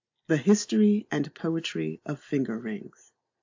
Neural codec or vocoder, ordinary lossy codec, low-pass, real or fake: none; AAC, 48 kbps; 7.2 kHz; real